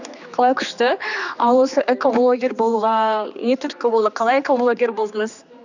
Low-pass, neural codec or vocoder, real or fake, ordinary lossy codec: 7.2 kHz; codec, 16 kHz, 2 kbps, X-Codec, HuBERT features, trained on general audio; fake; none